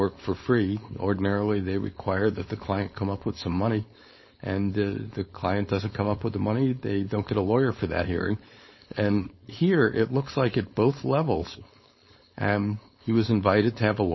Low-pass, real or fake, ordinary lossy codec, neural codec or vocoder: 7.2 kHz; fake; MP3, 24 kbps; codec, 16 kHz, 4.8 kbps, FACodec